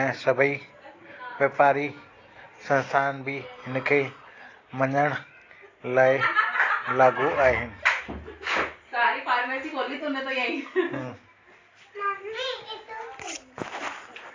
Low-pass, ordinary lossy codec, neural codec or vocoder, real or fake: 7.2 kHz; AAC, 32 kbps; none; real